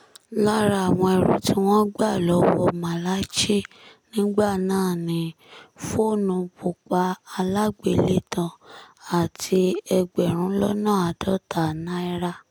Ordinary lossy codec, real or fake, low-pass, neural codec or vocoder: none; real; none; none